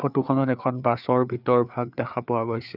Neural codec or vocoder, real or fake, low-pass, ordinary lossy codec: codec, 16 kHz, 4 kbps, FreqCodec, larger model; fake; 5.4 kHz; none